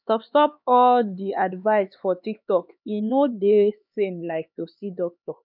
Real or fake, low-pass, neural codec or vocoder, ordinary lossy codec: fake; 5.4 kHz; codec, 16 kHz, 4 kbps, X-Codec, HuBERT features, trained on LibriSpeech; none